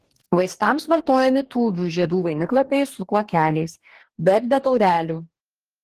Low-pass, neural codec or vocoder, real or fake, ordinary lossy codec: 14.4 kHz; codec, 44.1 kHz, 2.6 kbps, DAC; fake; Opus, 16 kbps